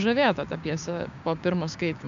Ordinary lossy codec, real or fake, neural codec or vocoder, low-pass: MP3, 64 kbps; fake; codec, 16 kHz, 6 kbps, DAC; 7.2 kHz